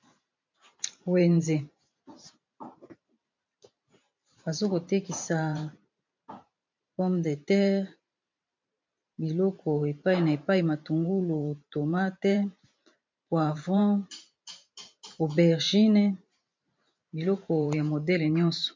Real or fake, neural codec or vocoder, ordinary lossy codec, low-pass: fake; vocoder, 44.1 kHz, 128 mel bands every 512 samples, BigVGAN v2; MP3, 48 kbps; 7.2 kHz